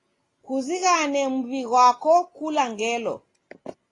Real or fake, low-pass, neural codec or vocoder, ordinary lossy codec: real; 10.8 kHz; none; AAC, 32 kbps